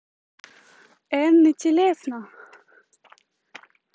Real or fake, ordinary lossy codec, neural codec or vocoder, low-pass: real; none; none; none